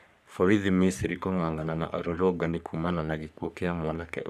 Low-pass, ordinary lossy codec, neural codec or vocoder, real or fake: 14.4 kHz; none; codec, 44.1 kHz, 3.4 kbps, Pupu-Codec; fake